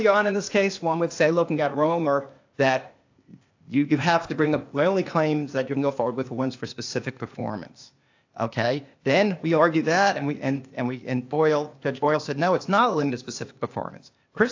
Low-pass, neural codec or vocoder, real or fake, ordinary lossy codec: 7.2 kHz; codec, 16 kHz, 0.8 kbps, ZipCodec; fake; AAC, 48 kbps